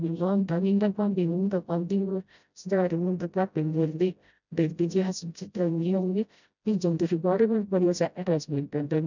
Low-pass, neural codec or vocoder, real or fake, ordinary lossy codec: 7.2 kHz; codec, 16 kHz, 0.5 kbps, FreqCodec, smaller model; fake; none